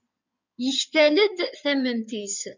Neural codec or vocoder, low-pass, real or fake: codec, 16 kHz in and 24 kHz out, 2.2 kbps, FireRedTTS-2 codec; 7.2 kHz; fake